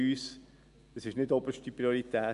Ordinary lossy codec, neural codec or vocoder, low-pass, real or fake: none; none; 10.8 kHz; real